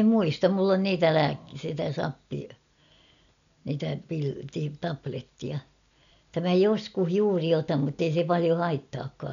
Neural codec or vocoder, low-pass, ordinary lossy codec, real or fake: none; 7.2 kHz; none; real